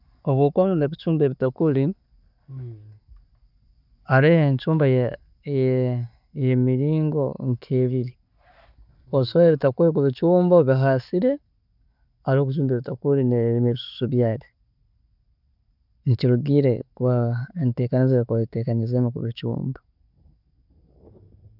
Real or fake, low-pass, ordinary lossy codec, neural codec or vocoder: real; 5.4 kHz; none; none